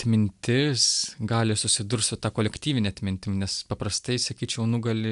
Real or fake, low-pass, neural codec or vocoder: real; 10.8 kHz; none